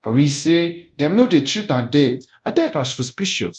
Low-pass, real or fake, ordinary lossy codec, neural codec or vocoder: 10.8 kHz; fake; MP3, 96 kbps; codec, 24 kHz, 0.5 kbps, DualCodec